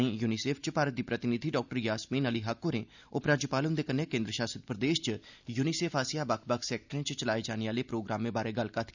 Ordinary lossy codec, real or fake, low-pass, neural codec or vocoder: none; real; 7.2 kHz; none